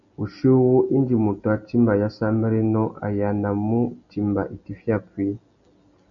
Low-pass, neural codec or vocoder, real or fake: 7.2 kHz; none; real